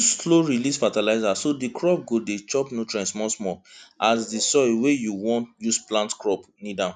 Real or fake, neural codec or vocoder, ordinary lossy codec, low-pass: real; none; none; none